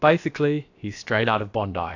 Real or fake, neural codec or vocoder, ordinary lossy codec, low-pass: fake; codec, 16 kHz, about 1 kbps, DyCAST, with the encoder's durations; AAC, 48 kbps; 7.2 kHz